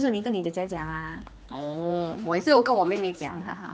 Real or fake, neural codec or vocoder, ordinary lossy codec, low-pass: fake; codec, 16 kHz, 2 kbps, X-Codec, HuBERT features, trained on general audio; none; none